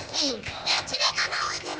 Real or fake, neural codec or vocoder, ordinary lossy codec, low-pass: fake; codec, 16 kHz, 0.8 kbps, ZipCodec; none; none